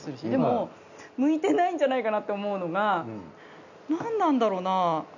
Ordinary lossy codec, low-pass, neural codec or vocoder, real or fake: none; 7.2 kHz; none; real